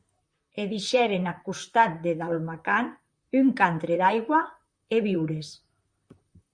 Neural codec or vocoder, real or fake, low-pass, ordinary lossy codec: vocoder, 44.1 kHz, 128 mel bands, Pupu-Vocoder; fake; 9.9 kHz; Opus, 64 kbps